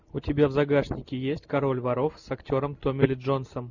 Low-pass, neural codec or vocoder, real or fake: 7.2 kHz; none; real